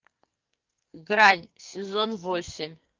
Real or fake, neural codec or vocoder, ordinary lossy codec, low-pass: fake; codec, 44.1 kHz, 2.6 kbps, SNAC; Opus, 24 kbps; 7.2 kHz